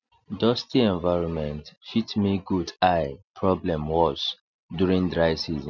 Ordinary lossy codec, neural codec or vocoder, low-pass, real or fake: none; none; 7.2 kHz; real